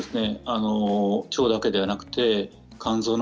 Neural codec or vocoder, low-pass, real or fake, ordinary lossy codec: none; none; real; none